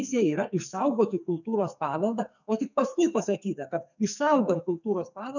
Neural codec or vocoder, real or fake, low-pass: codec, 44.1 kHz, 2.6 kbps, SNAC; fake; 7.2 kHz